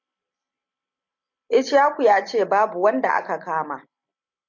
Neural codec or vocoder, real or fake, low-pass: none; real; 7.2 kHz